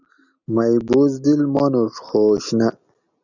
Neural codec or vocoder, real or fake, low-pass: none; real; 7.2 kHz